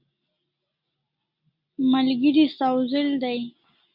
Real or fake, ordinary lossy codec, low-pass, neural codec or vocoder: real; Opus, 64 kbps; 5.4 kHz; none